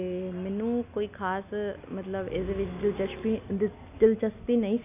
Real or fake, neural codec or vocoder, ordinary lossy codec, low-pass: real; none; none; 3.6 kHz